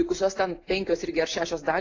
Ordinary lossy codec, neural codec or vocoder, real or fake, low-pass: AAC, 32 kbps; none; real; 7.2 kHz